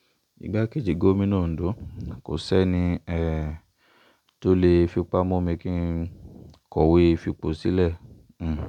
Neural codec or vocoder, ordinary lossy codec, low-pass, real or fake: none; none; 19.8 kHz; real